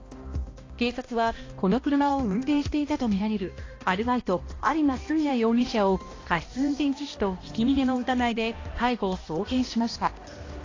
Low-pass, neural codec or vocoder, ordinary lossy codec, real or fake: 7.2 kHz; codec, 16 kHz, 1 kbps, X-Codec, HuBERT features, trained on balanced general audio; AAC, 32 kbps; fake